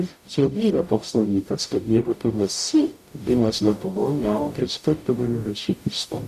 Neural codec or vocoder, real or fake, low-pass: codec, 44.1 kHz, 0.9 kbps, DAC; fake; 14.4 kHz